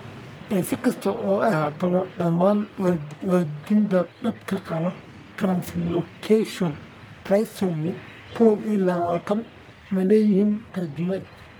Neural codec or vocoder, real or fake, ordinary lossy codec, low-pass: codec, 44.1 kHz, 1.7 kbps, Pupu-Codec; fake; none; none